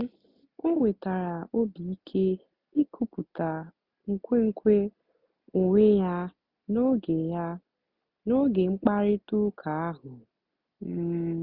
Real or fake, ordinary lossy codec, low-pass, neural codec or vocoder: real; Opus, 64 kbps; 5.4 kHz; none